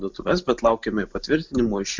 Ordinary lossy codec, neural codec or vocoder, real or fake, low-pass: MP3, 64 kbps; none; real; 7.2 kHz